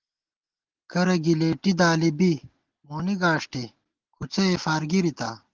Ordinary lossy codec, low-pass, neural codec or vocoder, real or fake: Opus, 16 kbps; 7.2 kHz; none; real